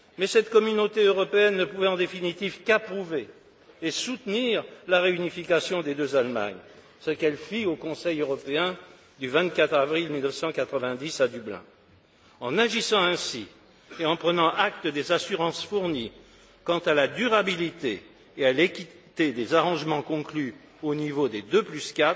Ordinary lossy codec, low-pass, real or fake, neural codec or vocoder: none; none; real; none